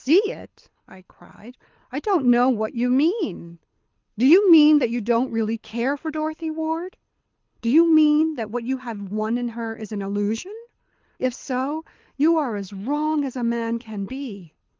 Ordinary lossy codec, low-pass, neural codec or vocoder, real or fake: Opus, 32 kbps; 7.2 kHz; codec, 24 kHz, 6 kbps, HILCodec; fake